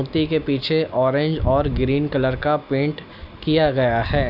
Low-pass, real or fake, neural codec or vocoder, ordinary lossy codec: 5.4 kHz; real; none; none